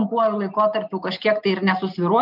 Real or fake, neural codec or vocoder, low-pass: real; none; 5.4 kHz